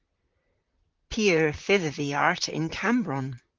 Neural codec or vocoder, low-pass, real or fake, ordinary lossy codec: vocoder, 44.1 kHz, 128 mel bands, Pupu-Vocoder; 7.2 kHz; fake; Opus, 32 kbps